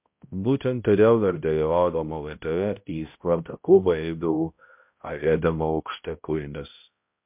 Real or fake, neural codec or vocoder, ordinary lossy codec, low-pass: fake; codec, 16 kHz, 0.5 kbps, X-Codec, HuBERT features, trained on balanced general audio; MP3, 32 kbps; 3.6 kHz